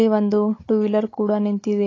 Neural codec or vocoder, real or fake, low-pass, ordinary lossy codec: codec, 16 kHz, 16 kbps, FunCodec, trained on Chinese and English, 50 frames a second; fake; 7.2 kHz; AAC, 32 kbps